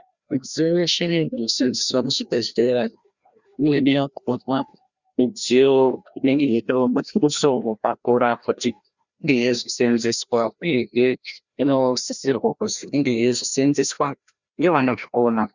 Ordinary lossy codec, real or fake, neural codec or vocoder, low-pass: Opus, 64 kbps; fake; codec, 16 kHz, 1 kbps, FreqCodec, larger model; 7.2 kHz